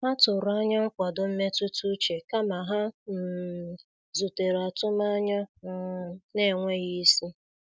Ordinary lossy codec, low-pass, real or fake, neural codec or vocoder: none; none; real; none